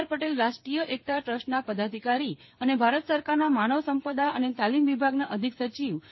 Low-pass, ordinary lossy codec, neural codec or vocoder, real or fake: 7.2 kHz; MP3, 24 kbps; codec, 16 kHz, 8 kbps, FreqCodec, smaller model; fake